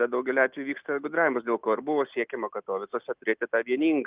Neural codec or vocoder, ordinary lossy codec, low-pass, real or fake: none; Opus, 24 kbps; 3.6 kHz; real